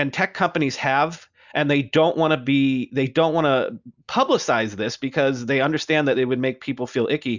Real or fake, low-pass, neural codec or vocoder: real; 7.2 kHz; none